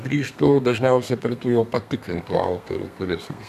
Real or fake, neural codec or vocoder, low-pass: fake; codec, 32 kHz, 1.9 kbps, SNAC; 14.4 kHz